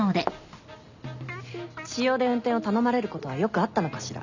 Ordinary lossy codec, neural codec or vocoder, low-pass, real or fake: none; none; 7.2 kHz; real